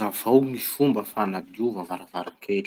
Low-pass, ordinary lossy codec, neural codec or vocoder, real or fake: 19.8 kHz; Opus, 24 kbps; none; real